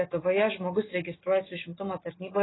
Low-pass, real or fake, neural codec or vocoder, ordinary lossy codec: 7.2 kHz; real; none; AAC, 16 kbps